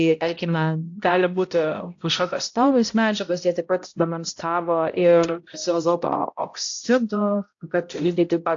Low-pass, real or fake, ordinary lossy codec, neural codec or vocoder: 7.2 kHz; fake; AAC, 48 kbps; codec, 16 kHz, 0.5 kbps, X-Codec, HuBERT features, trained on balanced general audio